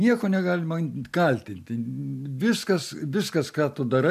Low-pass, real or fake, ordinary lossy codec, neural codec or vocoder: 14.4 kHz; real; MP3, 96 kbps; none